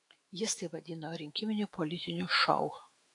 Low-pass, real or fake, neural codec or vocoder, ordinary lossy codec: 10.8 kHz; fake; autoencoder, 48 kHz, 128 numbers a frame, DAC-VAE, trained on Japanese speech; AAC, 48 kbps